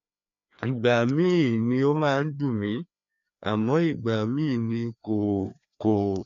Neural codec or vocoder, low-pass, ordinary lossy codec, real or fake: codec, 16 kHz, 2 kbps, FreqCodec, larger model; 7.2 kHz; none; fake